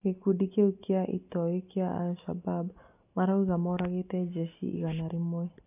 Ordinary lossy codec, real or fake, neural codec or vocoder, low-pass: AAC, 32 kbps; real; none; 3.6 kHz